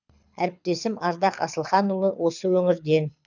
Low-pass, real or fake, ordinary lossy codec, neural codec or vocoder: 7.2 kHz; fake; none; codec, 24 kHz, 6 kbps, HILCodec